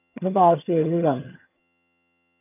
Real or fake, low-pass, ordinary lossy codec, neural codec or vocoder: fake; 3.6 kHz; none; vocoder, 22.05 kHz, 80 mel bands, HiFi-GAN